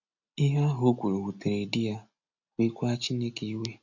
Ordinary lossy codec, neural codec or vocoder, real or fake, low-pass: none; none; real; 7.2 kHz